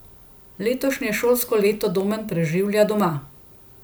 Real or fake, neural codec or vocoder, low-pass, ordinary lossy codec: real; none; none; none